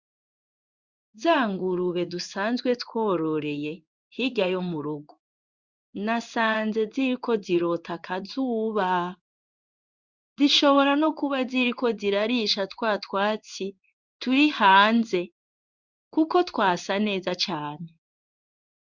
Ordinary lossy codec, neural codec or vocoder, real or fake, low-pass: Opus, 64 kbps; codec, 16 kHz in and 24 kHz out, 1 kbps, XY-Tokenizer; fake; 7.2 kHz